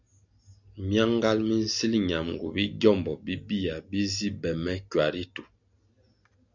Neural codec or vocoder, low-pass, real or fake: none; 7.2 kHz; real